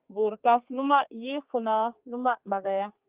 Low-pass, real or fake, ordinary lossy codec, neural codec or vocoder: 3.6 kHz; fake; Opus, 32 kbps; codec, 32 kHz, 1.9 kbps, SNAC